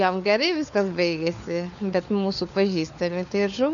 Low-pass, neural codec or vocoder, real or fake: 7.2 kHz; codec, 16 kHz, 4 kbps, FunCodec, trained on LibriTTS, 50 frames a second; fake